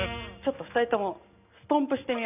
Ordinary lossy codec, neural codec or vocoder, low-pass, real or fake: none; none; 3.6 kHz; real